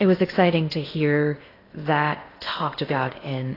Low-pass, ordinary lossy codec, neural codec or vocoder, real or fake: 5.4 kHz; AAC, 24 kbps; codec, 16 kHz in and 24 kHz out, 0.8 kbps, FocalCodec, streaming, 65536 codes; fake